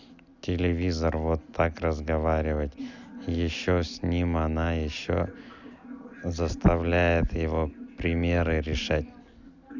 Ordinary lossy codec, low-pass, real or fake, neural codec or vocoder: none; 7.2 kHz; real; none